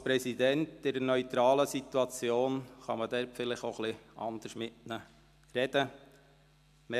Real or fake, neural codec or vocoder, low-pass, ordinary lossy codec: real; none; 14.4 kHz; AAC, 96 kbps